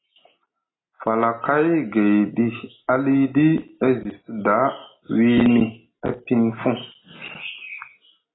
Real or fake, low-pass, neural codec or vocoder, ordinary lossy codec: real; 7.2 kHz; none; AAC, 16 kbps